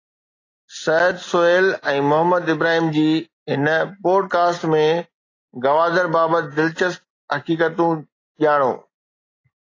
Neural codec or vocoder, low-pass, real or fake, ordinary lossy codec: none; 7.2 kHz; real; AAC, 32 kbps